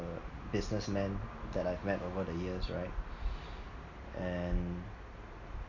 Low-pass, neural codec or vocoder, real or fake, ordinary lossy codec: 7.2 kHz; none; real; none